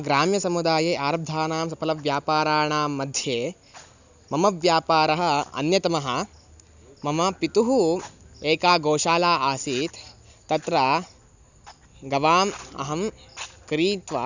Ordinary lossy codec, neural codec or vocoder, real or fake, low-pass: none; none; real; 7.2 kHz